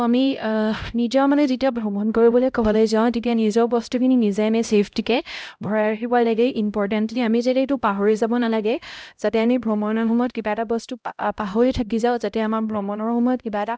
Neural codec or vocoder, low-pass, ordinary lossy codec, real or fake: codec, 16 kHz, 0.5 kbps, X-Codec, HuBERT features, trained on LibriSpeech; none; none; fake